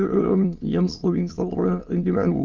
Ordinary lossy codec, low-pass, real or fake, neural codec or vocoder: Opus, 24 kbps; 7.2 kHz; fake; autoencoder, 22.05 kHz, a latent of 192 numbers a frame, VITS, trained on many speakers